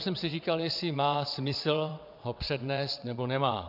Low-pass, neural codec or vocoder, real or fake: 5.4 kHz; vocoder, 22.05 kHz, 80 mel bands, WaveNeXt; fake